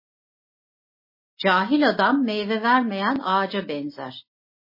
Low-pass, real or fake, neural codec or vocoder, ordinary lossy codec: 5.4 kHz; real; none; MP3, 24 kbps